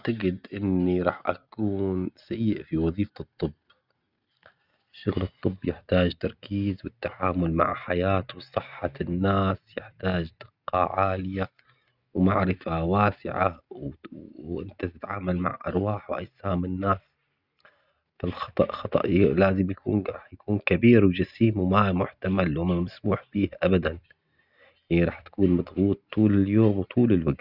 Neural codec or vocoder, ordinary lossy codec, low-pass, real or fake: none; Opus, 64 kbps; 5.4 kHz; real